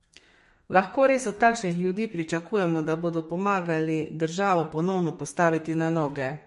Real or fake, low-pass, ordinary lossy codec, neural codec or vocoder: fake; 14.4 kHz; MP3, 48 kbps; codec, 32 kHz, 1.9 kbps, SNAC